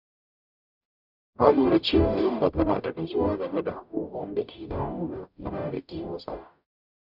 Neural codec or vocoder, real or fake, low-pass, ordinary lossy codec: codec, 44.1 kHz, 0.9 kbps, DAC; fake; 5.4 kHz; none